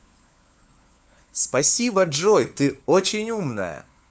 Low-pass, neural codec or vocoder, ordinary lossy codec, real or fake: none; codec, 16 kHz, 4 kbps, FunCodec, trained on LibriTTS, 50 frames a second; none; fake